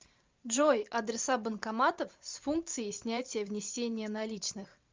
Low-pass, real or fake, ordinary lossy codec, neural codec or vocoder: 7.2 kHz; fake; Opus, 24 kbps; vocoder, 44.1 kHz, 128 mel bands every 512 samples, BigVGAN v2